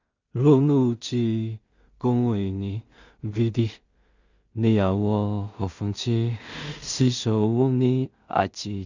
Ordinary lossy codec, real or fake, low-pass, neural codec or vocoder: Opus, 64 kbps; fake; 7.2 kHz; codec, 16 kHz in and 24 kHz out, 0.4 kbps, LongCat-Audio-Codec, two codebook decoder